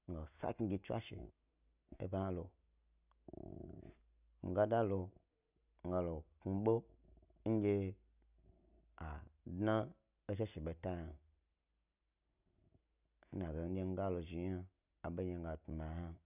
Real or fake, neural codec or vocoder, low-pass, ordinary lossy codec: real; none; 3.6 kHz; none